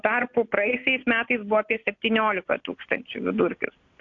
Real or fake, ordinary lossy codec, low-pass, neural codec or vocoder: real; AAC, 48 kbps; 7.2 kHz; none